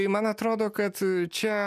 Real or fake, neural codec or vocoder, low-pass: real; none; 14.4 kHz